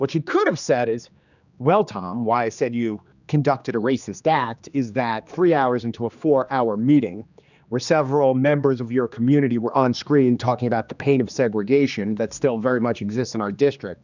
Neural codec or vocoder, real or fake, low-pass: codec, 16 kHz, 2 kbps, X-Codec, HuBERT features, trained on general audio; fake; 7.2 kHz